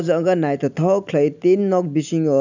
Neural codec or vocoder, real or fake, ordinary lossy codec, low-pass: none; real; none; 7.2 kHz